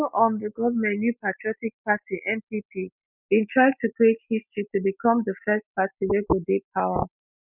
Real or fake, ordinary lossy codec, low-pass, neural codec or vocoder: real; none; 3.6 kHz; none